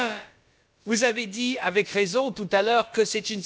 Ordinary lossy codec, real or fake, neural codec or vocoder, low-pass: none; fake; codec, 16 kHz, about 1 kbps, DyCAST, with the encoder's durations; none